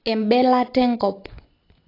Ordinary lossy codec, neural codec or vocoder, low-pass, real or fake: AAC, 24 kbps; none; 5.4 kHz; real